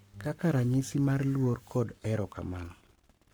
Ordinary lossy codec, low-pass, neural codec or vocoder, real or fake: none; none; codec, 44.1 kHz, 7.8 kbps, Pupu-Codec; fake